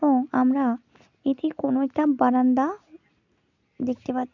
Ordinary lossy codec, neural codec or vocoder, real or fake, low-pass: none; none; real; 7.2 kHz